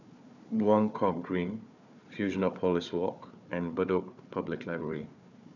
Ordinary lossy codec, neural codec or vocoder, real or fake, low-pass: none; codec, 16 kHz, 4 kbps, FunCodec, trained on Chinese and English, 50 frames a second; fake; 7.2 kHz